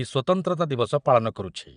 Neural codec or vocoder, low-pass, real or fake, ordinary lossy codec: vocoder, 22.05 kHz, 80 mel bands, Vocos; 9.9 kHz; fake; none